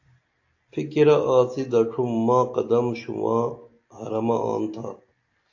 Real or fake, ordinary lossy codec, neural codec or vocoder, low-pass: real; AAC, 48 kbps; none; 7.2 kHz